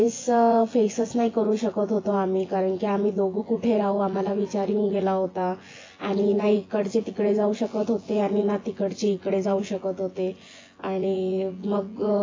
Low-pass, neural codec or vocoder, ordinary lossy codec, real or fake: 7.2 kHz; vocoder, 24 kHz, 100 mel bands, Vocos; AAC, 32 kbps; fake